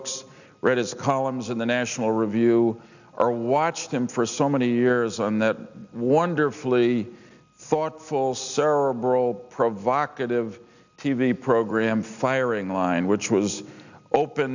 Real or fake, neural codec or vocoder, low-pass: real; none; 7.2 kHz